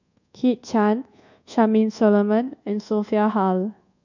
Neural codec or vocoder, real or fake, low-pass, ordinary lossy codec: codec, 24 kHz, 1.2 kbps, DualCodec; fake; 7.2 kHz; none